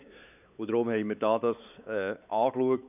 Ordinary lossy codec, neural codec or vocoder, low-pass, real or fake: none; codec, 16 kHz, 4 kbps, X-Codec, WavLM features, trained on Multilingual LibriSpeech; 3.6 kHz; fake